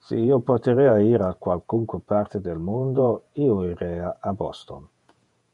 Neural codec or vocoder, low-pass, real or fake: vocoder, 48 kHz, 128 mel bands, Vocos; 10.8 kHz; fake